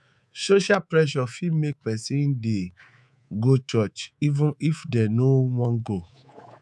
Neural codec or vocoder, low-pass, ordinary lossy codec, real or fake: codec, 24 kHz, 3.1 kbps, DualCodec; none; none; fake